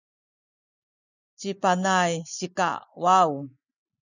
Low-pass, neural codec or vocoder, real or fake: 7.2 kHz; none; real